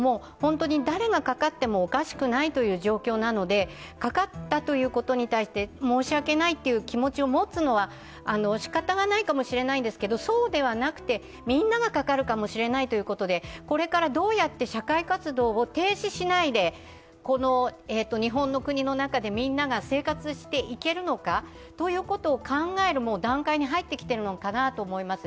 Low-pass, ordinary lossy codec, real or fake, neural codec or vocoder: none; none; real; none